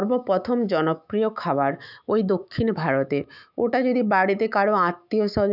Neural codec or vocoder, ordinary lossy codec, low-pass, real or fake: autoencoder, 48 kHz, 128 numbers a frame, DAC-VAE, trained on Japanese speech; none; 5.4 kHz; fake